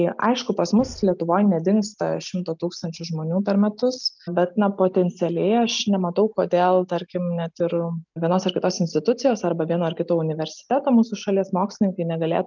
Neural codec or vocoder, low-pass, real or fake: none; 7.2 kHz; real